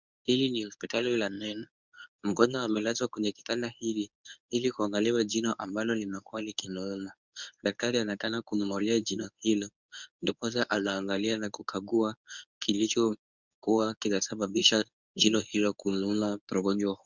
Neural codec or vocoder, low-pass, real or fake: codec, 24 kHz, 0.9 kbps, WavTokenizer, medium speech release version 1; 7.2 kHz; fake